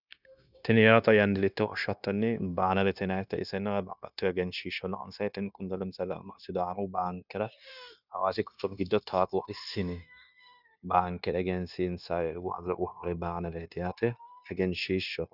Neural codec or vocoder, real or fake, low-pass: codec, 16 kHz, 0.9 kbps, LongCat-Audio-Codec; fake; 5.4 kHz